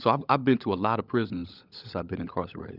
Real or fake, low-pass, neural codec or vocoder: fake; 5.4 kHz; codec, 16 kHz, 16 kbps, FunCodec, trained on LibriTTS, 50 frames a second